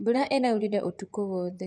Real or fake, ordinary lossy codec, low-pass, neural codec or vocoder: fake; none; 9.9 kHz; vocoder, 24 kHz, 100 mel bands, Vocos